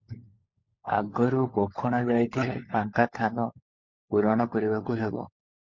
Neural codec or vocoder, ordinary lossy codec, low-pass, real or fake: codec, 16 kHz, 16 kbps, FunCodec, trained on LibriTTS, 50 frames a second; MP3, 48 kbps; 7.2 kHz; fake